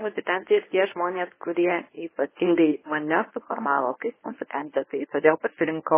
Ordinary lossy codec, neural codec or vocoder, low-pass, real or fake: MP3, 16 kbps; codec, 24 kHz, 0.9 kbps, WavTokenizer, medium speech release version 1; 3.6 kHz; fake